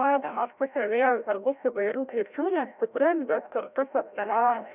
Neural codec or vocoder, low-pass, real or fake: codec, 16 kHz, 0.5 kbps, FreqCodec, larger model; 3.6 kHz; fake